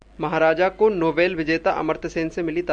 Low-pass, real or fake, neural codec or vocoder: 9.9 kHz; real; none